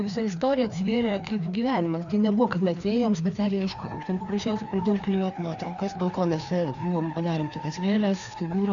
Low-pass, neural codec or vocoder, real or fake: 7.2 kHz; codec, 16 kHz, 2 kbps, FreqCodec, larger model; fake